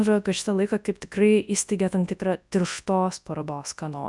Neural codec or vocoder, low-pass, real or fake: codec, 24 kHz, 0.9 kbps, WavTokenizer, large speech release; 10.8 kHz; fake